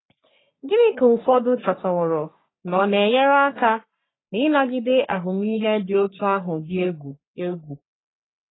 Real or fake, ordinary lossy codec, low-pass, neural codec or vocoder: fake; AAC, 16 kbps; 7.2 kHz; codec, 44.1 kHz, 3.4 kbps, Pupu-Codec